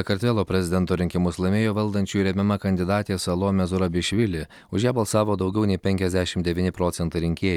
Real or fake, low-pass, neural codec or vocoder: real; 19.8 kHz; none